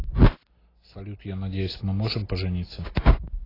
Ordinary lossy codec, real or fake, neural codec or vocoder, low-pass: AAC, 24 kbps; real; none; 5.4 kHz